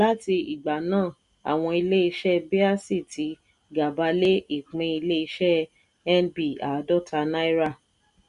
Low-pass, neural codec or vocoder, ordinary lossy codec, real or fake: 10.8 kHz; none; none; real